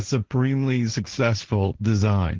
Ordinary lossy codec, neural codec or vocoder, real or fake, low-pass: Opus, 16 kbps; codec, 16 kHz, 1.1 kbps, Voila-Tokenizer; fake; 7.2 kHz